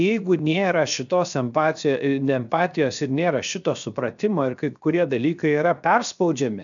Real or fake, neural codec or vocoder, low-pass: fake; codec, 16 kHz, 0.7 kbps, FocalCodec; 7.2 kHz